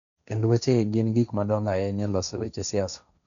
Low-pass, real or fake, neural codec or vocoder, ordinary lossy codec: 7.2 kHz; fake; codec, 16 kHz, 1.1 kbps, Voila-Tokenizer; none